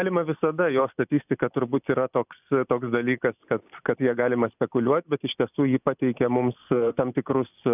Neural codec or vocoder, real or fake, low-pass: none; real; 3.6 kHz